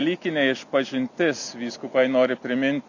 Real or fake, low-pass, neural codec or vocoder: real; 7.2 kHz; none